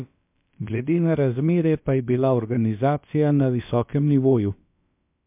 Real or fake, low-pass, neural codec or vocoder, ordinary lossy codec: fake; 3.6 kHz; codec, 16 kHz, about 1 kbps, DyCAST, with the encoder's durations; MP3, 32 kbps